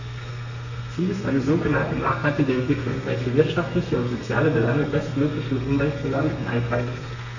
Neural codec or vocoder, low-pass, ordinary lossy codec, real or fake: codec, 32 kHz, 1.9 kbps, SNAC; 7.2 kHz; none; fake